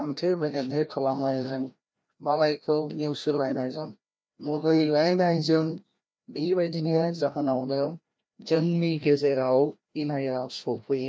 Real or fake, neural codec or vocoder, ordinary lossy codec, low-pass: fake; codec, 16 kHz, 1 kbps, FreqCodec, larger model; none; none